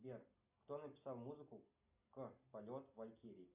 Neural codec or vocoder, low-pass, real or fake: none; 3.6 kHz; real